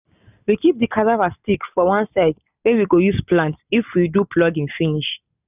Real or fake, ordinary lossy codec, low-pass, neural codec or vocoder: real; none; 3.6 kHz; none